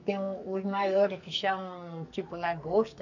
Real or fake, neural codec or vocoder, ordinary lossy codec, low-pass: fake; codec, 44.1 kHz, 2.6 kbps, SNAC; none; 7.2 kHz